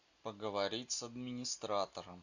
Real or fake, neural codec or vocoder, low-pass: real; none; 7.2 kHz